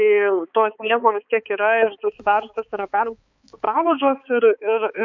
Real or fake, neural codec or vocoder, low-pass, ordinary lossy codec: fake; codec, 16 kHz, 4 kbps, X-Codec, HuBERT features, trained on balanced general audio; 7.2 kHz; MP3, 48 kbps